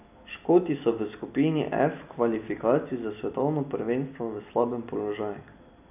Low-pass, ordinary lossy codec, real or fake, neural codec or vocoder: 3.6 kHz; none; real; none